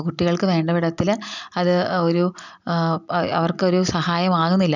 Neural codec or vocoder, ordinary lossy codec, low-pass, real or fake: none; none; 7.2 kHz; real